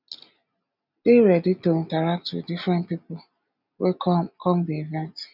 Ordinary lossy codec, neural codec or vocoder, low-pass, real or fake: none; none; 5.4 kHz; real